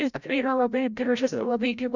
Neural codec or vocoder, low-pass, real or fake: codec, 16 kHz, 0.5 kbps, FreqCodec, larger model; 7.2 kHz; fake